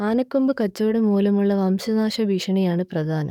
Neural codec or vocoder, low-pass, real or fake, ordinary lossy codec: codec, 44.1 kHz, 7.8 kbps, DAC; 19.8 kHz; fake; none